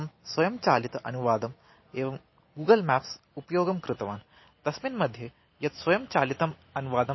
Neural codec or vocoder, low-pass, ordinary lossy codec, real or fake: none; 7.2 kHz; MP3, 24 kbps; real